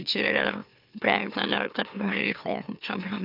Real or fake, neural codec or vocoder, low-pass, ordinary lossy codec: fake; autoencoder, 44.1 kHz, a latent of 192 numbers a frame, MeloTTS; 5.4 kHz; none